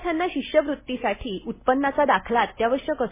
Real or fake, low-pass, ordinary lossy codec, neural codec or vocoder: real; 3.6 kHz; MP3, 16 kbps; none